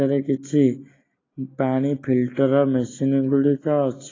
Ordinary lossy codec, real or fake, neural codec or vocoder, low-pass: AAC, 32 kbps; real; none; 7.2 kHz